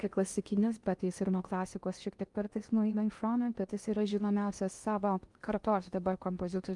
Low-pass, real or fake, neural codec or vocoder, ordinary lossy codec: 10.8 kHz; fake; codec, 16 kHz in and 24 kHz out, 0.6 kbps, FocalCodec, streaming, 2048 codes; Opus, 32 kbps